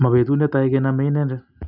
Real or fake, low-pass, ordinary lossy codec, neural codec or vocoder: real; 5.4 kHz; none; none